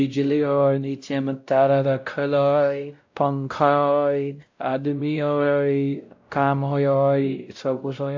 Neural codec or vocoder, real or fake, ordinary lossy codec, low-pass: codec, 16 kHz, 0.5 kbps, X-Codec, WavLM features, trained on Multilingual LibriSpeech; fake; AAC, 48 kbps; 7.2 kHz